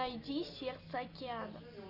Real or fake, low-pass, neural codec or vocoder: real; 5.4 kHz; none